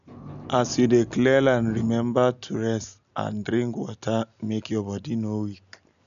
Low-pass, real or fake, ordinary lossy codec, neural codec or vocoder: 7.2 kHz; real; none; none